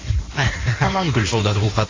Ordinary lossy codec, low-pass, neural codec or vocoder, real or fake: AAC, 32 kbps; 7.2 kHz; codec, 16 kHz in and 24 kHz out, 1.1 kbps, FireRedTTS-2 codec; fake